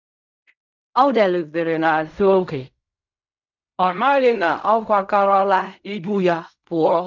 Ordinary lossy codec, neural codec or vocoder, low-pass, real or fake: none; codec, 16 kHz in and 24 kHz out, 0.4 kbps, LongCat-Audio-Codec, fine tuned four codebook decoder; 7.2 kHz; fake